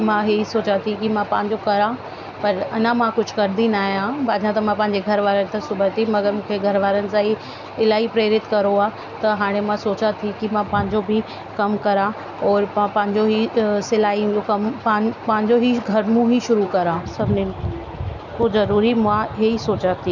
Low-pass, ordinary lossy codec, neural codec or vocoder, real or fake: 7.2 kHz; none; none; real